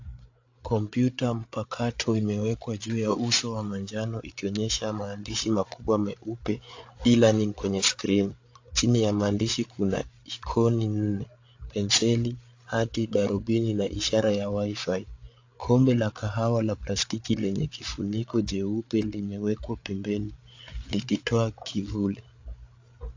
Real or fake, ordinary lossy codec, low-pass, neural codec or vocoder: fake; AAC, 48 kbps; 7.2 kHz; codec, 16 kHz, 8 kbps, FreqCodec, larger model